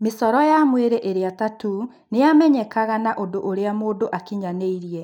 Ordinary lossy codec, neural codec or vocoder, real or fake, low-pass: none; none; real; 19.8 kHz